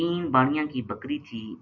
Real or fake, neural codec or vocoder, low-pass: real; none; 7.2 kHz